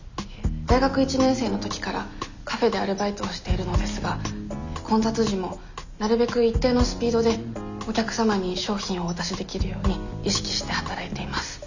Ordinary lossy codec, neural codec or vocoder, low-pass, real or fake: none; none; 7.2 kHz; real